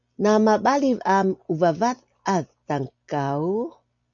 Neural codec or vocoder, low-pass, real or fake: none; 7.2 kHz; real